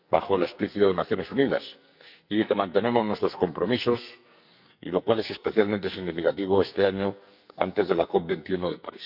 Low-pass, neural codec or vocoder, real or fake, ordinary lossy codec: 5.4 kHz; codec, 44.1 kHz, 2.6 kbps, SNAC; fake; none